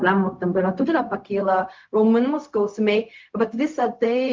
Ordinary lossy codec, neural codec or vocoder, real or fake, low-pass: Opus, 32 kbps; codec, 16 kHz, 0.4 kbps, LongCat-Audio-Codec; fake; 7.2 kHz